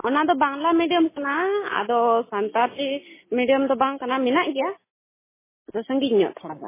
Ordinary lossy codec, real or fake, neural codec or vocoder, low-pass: MP3, 16 kbps; real; none; 3.6 kHz